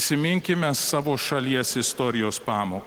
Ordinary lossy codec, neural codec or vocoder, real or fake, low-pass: Opus, 16 kbps; none; real; 19.8 kHz